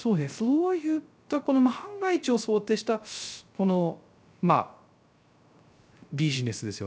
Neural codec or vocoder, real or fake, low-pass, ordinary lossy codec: codec, 16 kHz, 0.3 kbps, FocalCodec; fake; none; none